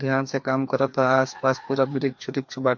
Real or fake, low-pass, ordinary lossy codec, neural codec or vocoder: fake; 7.2 kHz; MP3, 48 kbps; codec, 16 kHz, 2 kbps, FreqCodec, larger model